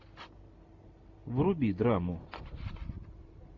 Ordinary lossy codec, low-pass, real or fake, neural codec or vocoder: MP3, 48 kbps; 7.2 kHz; real; none